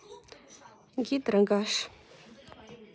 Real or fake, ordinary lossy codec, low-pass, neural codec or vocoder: real; none; none; none